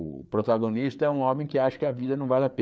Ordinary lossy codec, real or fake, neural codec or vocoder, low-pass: none; fake; codec, 16 kHz, 4 kbps, FreqCodec, larger model; none